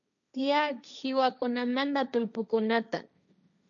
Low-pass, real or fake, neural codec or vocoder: 7.2 kHz; fake; codec, 16 kHz, 1.1 kbps, Voila-Tokenizer